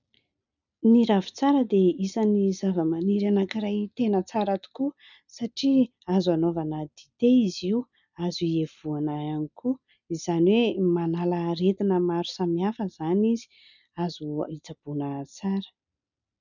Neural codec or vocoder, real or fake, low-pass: none; real; 7.2 kHz